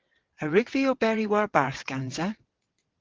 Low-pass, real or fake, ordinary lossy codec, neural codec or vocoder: 7.2 kHz; fake; Opus, 16 kbps; vocoder, 22.05 kHz, 80 mel bands, WaveNeXt